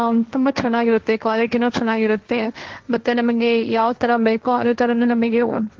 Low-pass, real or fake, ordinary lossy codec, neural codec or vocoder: 7.2 kHz; fake; Opus, 16 kbps; codec, 16 kHz, 1.1 kbps, Voila-Tokenizer